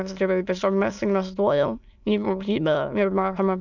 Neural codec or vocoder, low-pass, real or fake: autoencoder, 22.05 kHz, a latent of 192 numbers a frame, VITS, trained on many speakers; 7.2 kHz; fake